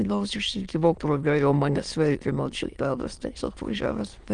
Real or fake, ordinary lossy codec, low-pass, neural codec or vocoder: fake; Opus, 24 kbps; 9.9 kHz; autoencoder, 22.05 kHz, a latent of 192 numbers a frame, VITS, trained on many speakers